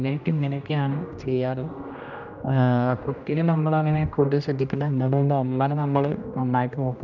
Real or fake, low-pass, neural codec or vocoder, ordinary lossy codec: fake; 7.2 kHz; codec, 16 kHz, 1 kbps, X-Codec, HuBERT features, trained on general audio; none